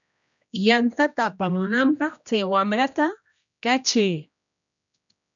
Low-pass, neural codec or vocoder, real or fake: 7.2 kHz; codec, 16 kHz, 1 kbps, X-Codec, HuBERT features, trained on general audio; fake